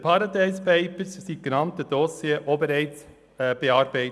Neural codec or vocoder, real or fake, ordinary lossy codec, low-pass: none; real; none; none